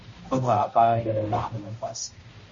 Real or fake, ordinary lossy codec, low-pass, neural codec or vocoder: fake; MP3, 32 kbps; 7.2 kHz; codec, 16 kHz, 0.5 kbps, X-Codec, HuBERT features, trained on balanced general audio